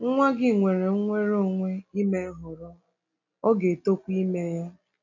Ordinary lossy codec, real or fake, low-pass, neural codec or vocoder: AAC, 48 kbps; real; 7.2 kHz; none